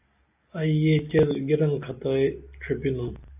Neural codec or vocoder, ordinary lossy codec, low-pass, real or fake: none; AAC, 32 kbps; 3.6 kHz; real